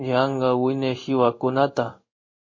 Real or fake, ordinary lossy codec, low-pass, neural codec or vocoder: fake; MP3, 32 kbps; 7.2 kHz; codec, 16 kHz in and 24 kHz out, 1 kbps, XY-Tokenizer